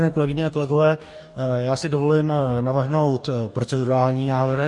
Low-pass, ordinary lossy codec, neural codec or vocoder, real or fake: 10.8 kHz; MP3, 48 kbps; codec, 44.1 kHz, 2.6 kbps, DAC; fake